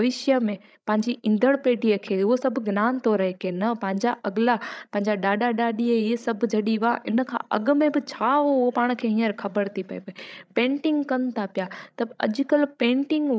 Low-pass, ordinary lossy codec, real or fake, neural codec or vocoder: none; none; fake; codec, 16 kHz, 16 kbps, FreqCodec, larger model